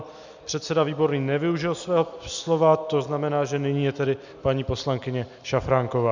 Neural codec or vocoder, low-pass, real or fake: none; 7.2 kHz; real